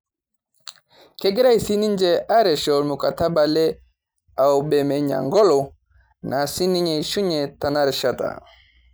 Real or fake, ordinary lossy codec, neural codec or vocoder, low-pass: real; none; none; none